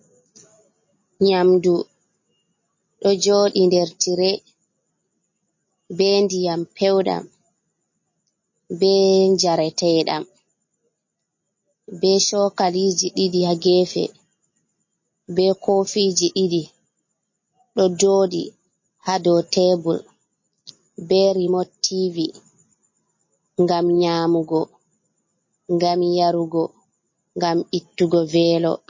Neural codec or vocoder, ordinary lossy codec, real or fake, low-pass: none; MP3, 32 kbps; real; 7.2 kHz